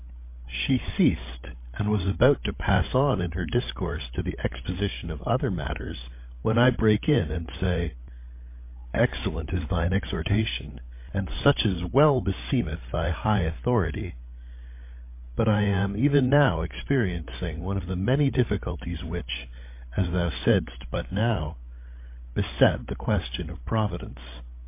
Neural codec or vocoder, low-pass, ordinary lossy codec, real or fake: codec, 16 kHz, 16 kbps, FreqCodec, larger model; 3.6 kHz; MP3, 24 kbps; fake